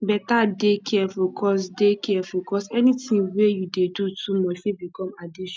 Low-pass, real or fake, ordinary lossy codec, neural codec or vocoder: none; real; none; none